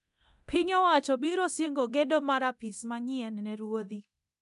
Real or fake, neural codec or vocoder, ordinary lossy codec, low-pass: fake; codec, 24 kHz, 0.9 kbps, DualCodec; none; 10.8 kHz